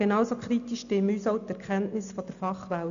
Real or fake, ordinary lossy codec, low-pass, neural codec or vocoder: real; none; 7.2 kHz; none